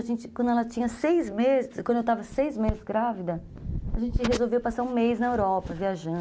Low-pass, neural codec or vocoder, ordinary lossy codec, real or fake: none; none; none; real